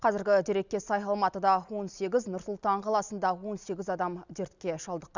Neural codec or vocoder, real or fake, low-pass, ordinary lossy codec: none; real; 7.2 kHz; none